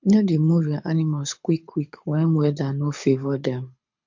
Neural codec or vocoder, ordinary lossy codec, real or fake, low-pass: codec, 24 kHz, 6 kbps, HILCodec; MP3, 48 kbps; fake; 7.2 kHz